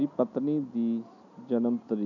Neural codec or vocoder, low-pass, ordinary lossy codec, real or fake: none; 7.2 kHz; none; real